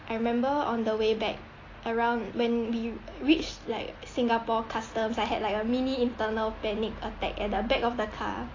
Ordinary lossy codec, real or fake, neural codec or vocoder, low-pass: AAC, 32 kbps; real; none; 7.2 kHz